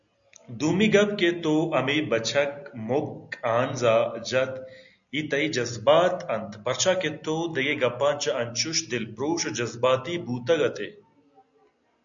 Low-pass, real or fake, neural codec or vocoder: 7.2 kHz; real; none